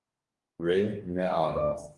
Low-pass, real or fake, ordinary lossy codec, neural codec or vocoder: 10.8 kHz; fake; Opus, 32 kbps; codec, 44.1 kHz, 2.6 kbps, DAC